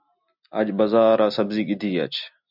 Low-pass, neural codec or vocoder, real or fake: 5.4 kHz; none; real